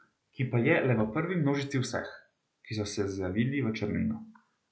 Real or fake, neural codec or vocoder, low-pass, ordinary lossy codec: real; none; none; none